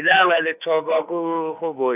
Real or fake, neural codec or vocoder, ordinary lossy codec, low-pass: fake; autoencoder, 48 kHz, 32 numbers a frame, DAC-VAE, trained on Japanese speech; none; 3.6 kHz